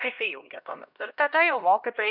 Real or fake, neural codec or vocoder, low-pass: fake; codec, 16 kHz, 1 kbps, X-Codec, HuBERT features, trained on LibriSpeech; 5.4 kHz